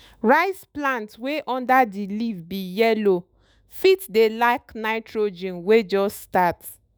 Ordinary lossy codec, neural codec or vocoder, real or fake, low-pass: none; autoencoder, 48 kHz, 128 numbers a frame, DAC-VAE, trained on Japanese speech; fake; none